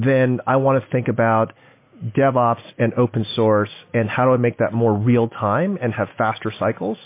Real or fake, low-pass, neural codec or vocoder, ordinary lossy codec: real; 3.6 kHz; none; MP3, 24 kbps